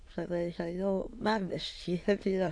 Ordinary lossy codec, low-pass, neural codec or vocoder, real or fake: AAC, 48 kbps; 9.9 kHz; autoencoder, 22.05 kHz, a latent of 192 numbers a frame, VITS, trained on many speakers; fake